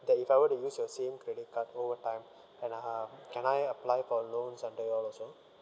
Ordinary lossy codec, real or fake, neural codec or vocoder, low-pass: none; real; none; none